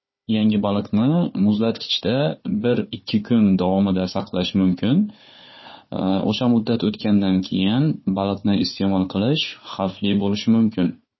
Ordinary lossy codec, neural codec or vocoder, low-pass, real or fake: MP3, 24 kbps; codec, 16 kHz, 4 kbps, FunCodec, trained on Chinese and English, 50 frames a second; 7.2 kHz; fake